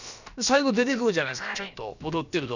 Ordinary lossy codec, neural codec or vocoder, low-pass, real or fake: none; codec, 16 kHz, about 1 kbps, DyCAST, with the encoder's durations; 7.2 kHz; fake